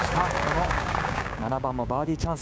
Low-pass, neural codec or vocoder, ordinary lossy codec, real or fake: none; codec, 16 kHz, 6 kbps, DAC; none; fake